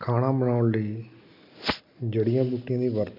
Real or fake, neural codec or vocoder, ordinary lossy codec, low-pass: real; none; MP3, 48 kbps; 5.4 kHz